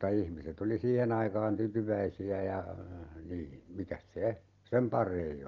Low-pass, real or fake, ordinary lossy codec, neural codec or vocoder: 7.2 kHz; real; Opus, 24 kbps; none